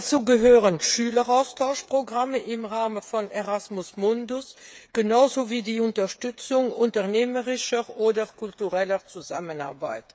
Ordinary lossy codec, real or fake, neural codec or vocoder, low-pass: none; fake; codec, 16 kHz, 8 kbps, FreqCodec, smaller model; none